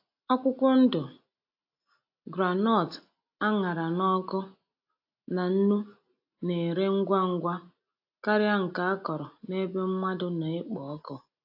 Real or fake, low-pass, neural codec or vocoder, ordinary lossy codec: real; 5.4 kHz; none; AAC, 48 kbps